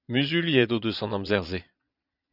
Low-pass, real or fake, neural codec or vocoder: 5.4 kHz; fake; vocoder, 22.05 kHz, 80 mel bands, Vocos